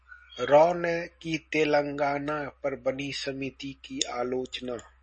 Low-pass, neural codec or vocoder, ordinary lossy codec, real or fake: 10.8 kHz; none; MP3, 32 kbps; real